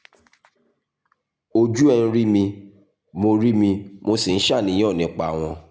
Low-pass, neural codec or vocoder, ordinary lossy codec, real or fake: none; none; none; real